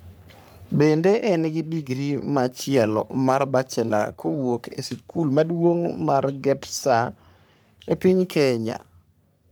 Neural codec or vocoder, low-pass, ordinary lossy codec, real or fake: codec, 44.1 kHz, 3.4 kbps, Pupu-Codec; none; none; fake